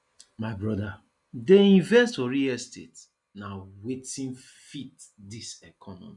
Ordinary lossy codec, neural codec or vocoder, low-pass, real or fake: none; none; 10.8 kHz; real